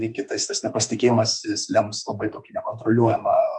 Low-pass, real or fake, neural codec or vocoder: 10.8 kHz; fake; autoencoder, 48 kHz, 32 numbers a frame, DAC-VAE, trained on Japanese speech